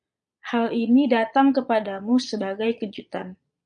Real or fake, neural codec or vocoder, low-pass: fake; vocoder, 22.05 kHz, 80 mel bands, Vocos; 9.9 kHz